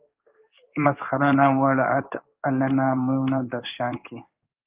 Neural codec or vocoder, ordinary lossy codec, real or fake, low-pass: codec, 16 kHz in and 24 kHz out, 1 kbps, XY-Tokenizer; Opus, 32 kbps; fake; 3.6 kHz